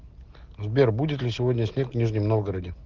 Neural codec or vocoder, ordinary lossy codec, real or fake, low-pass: none; Opus, 16 kbps; real; 7.2 kHz